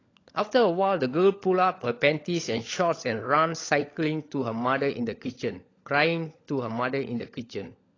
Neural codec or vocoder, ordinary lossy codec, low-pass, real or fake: codec, 16 kHz, 16 kbps, FunCodec, trained on LibriTTS, 50 frames a second; AAC, 32 kbps; 7.2 kHz; fake